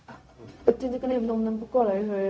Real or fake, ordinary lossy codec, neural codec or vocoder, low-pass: fake; none; codec, 16 kHz, 0.4 kbps, LongCat-Audio-Codec; none